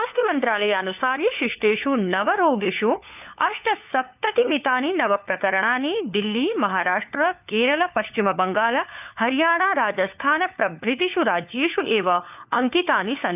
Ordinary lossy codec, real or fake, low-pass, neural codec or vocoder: none; fake; 3.6 kHz; codec, 16 kHz, 4 kbps, FunCodec, trained on LibriTTS, 50 frames a second